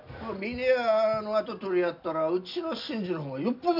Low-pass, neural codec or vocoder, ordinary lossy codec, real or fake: 5.4 kHz; none; MP3, 48 kbps; real